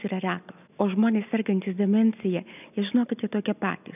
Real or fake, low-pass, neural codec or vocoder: real; 3.6 kHz; none